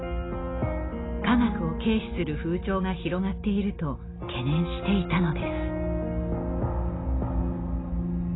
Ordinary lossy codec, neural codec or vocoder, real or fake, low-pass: AAC, 16 kbps; none; real; 7.2 kHz